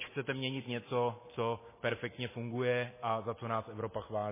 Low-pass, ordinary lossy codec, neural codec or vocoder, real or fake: 3.6 kHz; MP3, 16 kbps; none; real